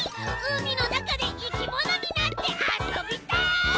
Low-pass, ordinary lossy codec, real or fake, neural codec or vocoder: none; none; real; none